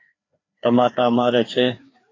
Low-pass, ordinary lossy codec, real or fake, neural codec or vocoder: 7.2 kHz; AAC, 32 kbps; fake; codec, 16 kHz, 2 kbps, FreqCodec, larger model